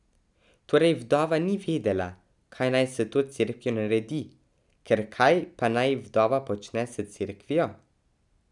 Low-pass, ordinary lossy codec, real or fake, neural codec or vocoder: 10.8 kHz; none; real; none